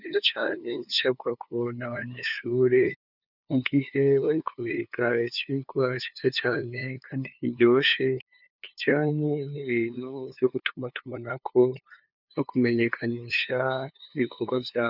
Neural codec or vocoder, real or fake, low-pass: codec, 16 kHz, 2 kbps, FunCodec, trained on LibriTTS, 25 frames a second; fake; 5.4 kHz